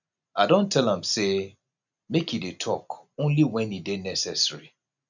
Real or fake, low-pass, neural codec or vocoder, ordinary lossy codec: real; 7.2 kHz; none; none